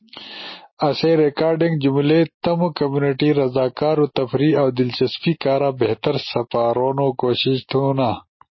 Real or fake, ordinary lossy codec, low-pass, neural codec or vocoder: real; MP3, 24 kbps; 7.2 kHz; none